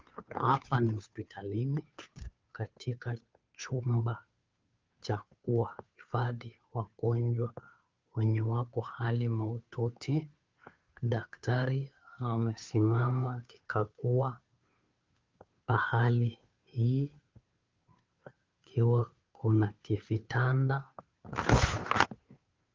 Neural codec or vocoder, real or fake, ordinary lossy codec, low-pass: codec, 16 kHz, 2 kbps, FunCodec, trained on Chinese and English, 25 frames a second; fake; Opus, 24 kbps; 7.2 kHz